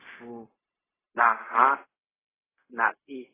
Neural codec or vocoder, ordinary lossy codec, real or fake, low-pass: codec, 16 kHz, 0.4 kbps, LongCat-Audio-Codec; AAC, 16 kbps; fake; 3.6 kHz